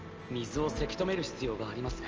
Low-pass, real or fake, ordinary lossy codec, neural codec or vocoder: 7.2 kHz; real; Opus, 24 kbps; none